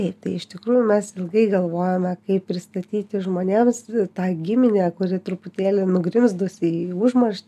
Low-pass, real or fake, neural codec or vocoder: 14.4 kHz; real; none